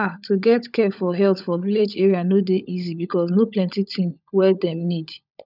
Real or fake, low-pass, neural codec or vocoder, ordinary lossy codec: fake; 5.4 kHz; codec, 16 kHz, 16 kbps, FunCodec, trained on LibriTTS, 50 frames a second; none